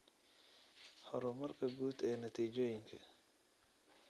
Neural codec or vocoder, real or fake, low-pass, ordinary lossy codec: none; real; 14.4 kHz; Opus, 32 kbps